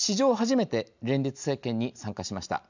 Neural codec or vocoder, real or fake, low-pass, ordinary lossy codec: none; real; 7.2 kHz; none